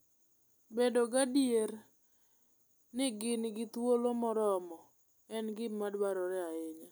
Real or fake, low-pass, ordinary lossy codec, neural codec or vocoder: real; none; none; none